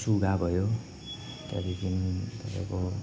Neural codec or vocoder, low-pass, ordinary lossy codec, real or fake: none; none; none; real